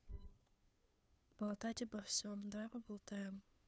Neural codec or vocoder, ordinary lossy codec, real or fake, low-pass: codec, 16 kHz, 2 kbps, FunCodec, trained on Chinese and English, 25 frames a second; none; fake; none